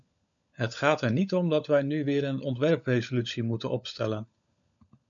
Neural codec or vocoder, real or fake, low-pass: codec, 16 kHz, 16 kbps, FunCodec, trained on LibriTTS, 50 frames a second; fake; 7.2 kHz